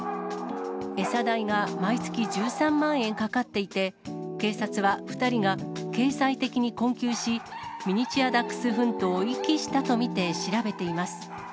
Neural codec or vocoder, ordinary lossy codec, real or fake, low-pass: none; none; real; none